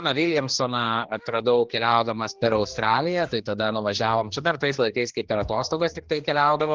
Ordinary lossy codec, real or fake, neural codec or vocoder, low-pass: Opus, 16 kbps; fake; codec, 16 kHz, 2 kbps, X-Codec, HuBERT features, trained on general audio; 7.2 kHz